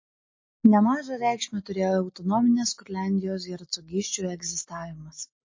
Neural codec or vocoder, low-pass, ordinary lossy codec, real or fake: none; 7.2 kHz; MP3, 32 kbps; real